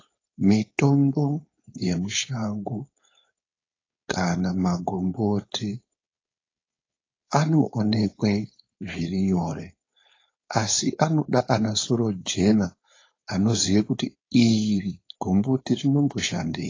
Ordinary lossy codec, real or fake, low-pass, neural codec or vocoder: AAC, 32 kbps; fake; 7.2 kHz; codec, 16 kHz, 4.8 kbps, FACodec